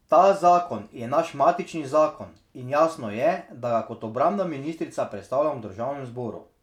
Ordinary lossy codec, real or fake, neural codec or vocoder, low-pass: none; real; none; 19.8 kHz